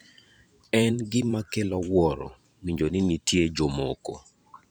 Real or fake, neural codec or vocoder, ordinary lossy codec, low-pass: fake; vocoder, 44.1 kHz, 128 mel bands every 512 samples, BigVGAN v2; none; none